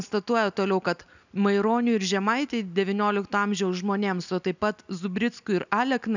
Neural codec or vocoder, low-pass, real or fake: none; 7.2 kHz; real